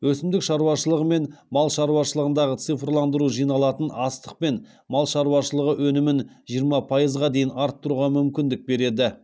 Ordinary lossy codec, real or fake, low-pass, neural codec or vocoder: none; real; none; none